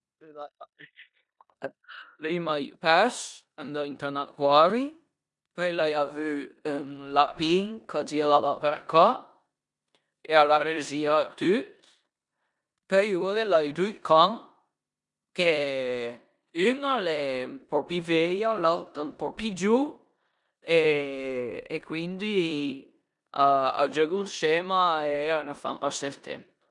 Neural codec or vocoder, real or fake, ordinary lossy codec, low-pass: codec, 16 kHz in and 24 kHz out, 0.9 kbps, LongCat-Audio-Codec, four codebook decoder; fake; none; 10.8 kHz